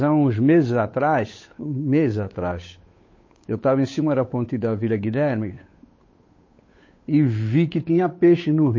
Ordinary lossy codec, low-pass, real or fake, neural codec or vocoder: MP3, 32 kbps; 7.2 kHz; fake; codec, 16 kHz, 8 kbps, FunCodec, trained on Chinese and English, 25 frames a second